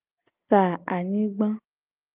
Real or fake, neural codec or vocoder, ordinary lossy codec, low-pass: real; none; Opus, 24 kbps; 3.6 kHz